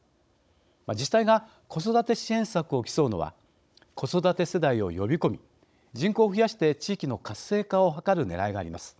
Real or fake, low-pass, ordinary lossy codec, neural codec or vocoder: fake; none; none; codec, 16 kHz, 16 kbps, FunCodec, trained on Chinese and English, 50 frames a second